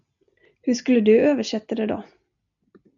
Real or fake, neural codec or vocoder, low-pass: real; none; 7.2 kHz